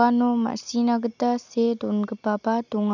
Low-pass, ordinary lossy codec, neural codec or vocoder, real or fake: 7.2 kHz; none; none; real